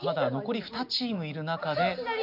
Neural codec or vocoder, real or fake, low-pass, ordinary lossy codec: none; real; 5.4 kHz; none